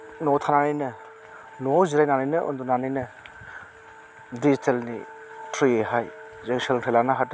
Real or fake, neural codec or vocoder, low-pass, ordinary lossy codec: real; none; none; none